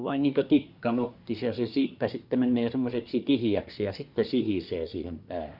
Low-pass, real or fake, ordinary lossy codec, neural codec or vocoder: 5.4 kHz; fake; none; codec, 24 kHz, 1 kbps, SNAC